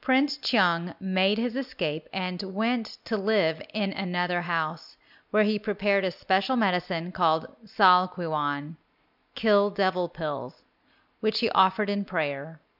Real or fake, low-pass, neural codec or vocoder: real; 5.4 kHz; none